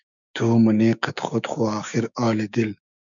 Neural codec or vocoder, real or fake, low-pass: codec, 16 kHz, 6 kbps, DAC; fake; 7.2 kHz